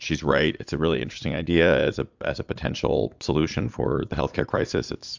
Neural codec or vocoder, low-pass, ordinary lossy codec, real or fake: vocoder, 22.05 kHz, 80 mel bands, Vocos; 7.2 kHz; MP3, 64 kbps; fake